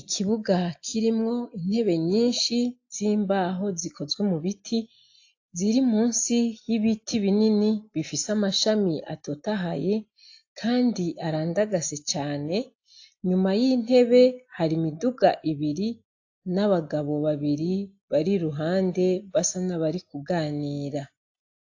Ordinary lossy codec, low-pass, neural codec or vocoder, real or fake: AAC, 48 kbps; 7.2 kHz; none; real